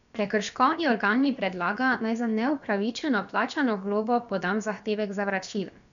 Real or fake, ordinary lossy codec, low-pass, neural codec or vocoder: fake; none; 7.2 kHz; codec, 16 kHz, about 1 kbps, DyCAST, with the encoder's durations